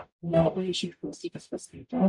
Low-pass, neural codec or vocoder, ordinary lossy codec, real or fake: 10.8 kHz; codec, 44.1 kHz, 0.9 kbps, DAC; MP3, 96 kbps; fake